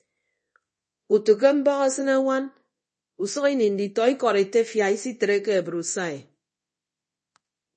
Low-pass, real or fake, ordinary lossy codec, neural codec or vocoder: 10.8 kHz; fake; MP3, 32 kbps; codec, 24 kHz, 0.9 kbps, DualCodec